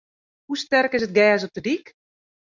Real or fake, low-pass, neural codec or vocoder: real; 7.2 kHz; none